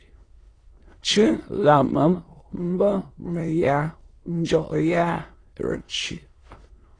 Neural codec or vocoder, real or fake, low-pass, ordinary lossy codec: autoencoder, 22.05 kHz, a latent of 192 numbers a frame, VITS, trained on many speakers; fake; 9.9 kHz; AAC, 32 kbps